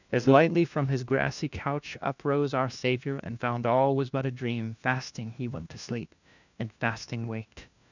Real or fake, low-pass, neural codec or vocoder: fake; 7.2 kHz; codec, 16 kHz, 1 kbps, FunCodec, trained on LibriTTS, 50 frames a second